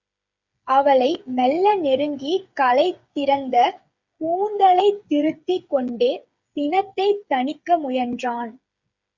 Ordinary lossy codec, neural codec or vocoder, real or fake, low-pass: Opus, 64 kbps; codec, 16 kHz, 8 kbps, FreqCodec, smaller model; fake; 7.2 kHz